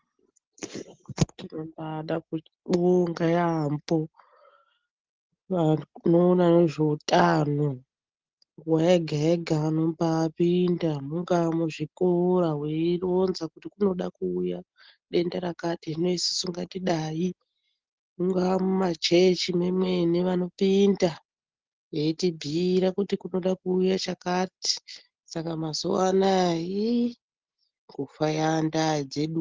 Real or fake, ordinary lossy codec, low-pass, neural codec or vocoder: real; Opus, 16 kbps; 7.2 kHz; none